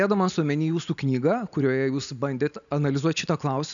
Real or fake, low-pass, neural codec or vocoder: real; 7.2 kHz; none